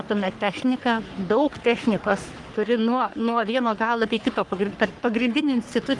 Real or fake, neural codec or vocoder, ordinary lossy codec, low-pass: fake; codec, 44.1 kHz, 3.4 kbps, Pupu-Codec; Opus, 32 kbps; 10.8 kHz